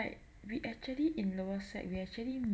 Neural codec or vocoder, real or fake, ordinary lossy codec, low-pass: none; real; none; none